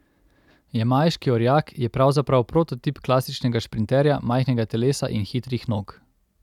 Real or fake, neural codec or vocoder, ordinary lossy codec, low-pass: real; none; none; 19.8 kHz